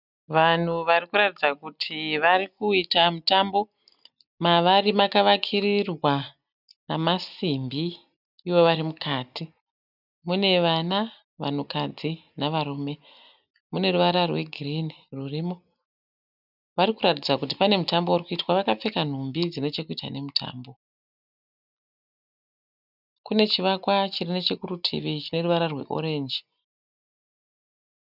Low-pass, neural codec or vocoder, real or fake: 5.4 kHz; none; real